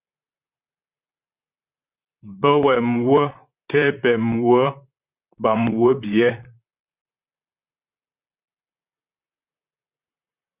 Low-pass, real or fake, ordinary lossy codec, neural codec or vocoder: 3.6 kHz; fake; Opus, 64 kbps; vocoder, 44.1 kHz, 128 mel bands, Pupu-Vocoder